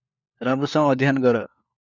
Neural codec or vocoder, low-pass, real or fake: codec, 16 kHz, 4 kbps, FunCodec, trained on LibriTTS, 50 frames a second; 7.2 kHz; fake